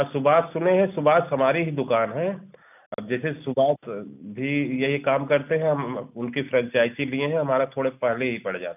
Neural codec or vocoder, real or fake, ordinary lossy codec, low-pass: none; real; none; 3.6 kHz